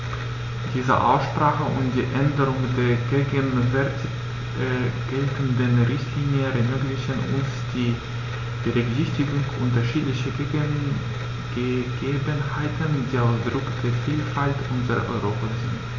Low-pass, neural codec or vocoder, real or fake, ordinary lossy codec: 7.2 kHz; none; real; none